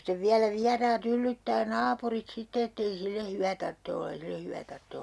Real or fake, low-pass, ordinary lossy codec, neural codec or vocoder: real; none; none; none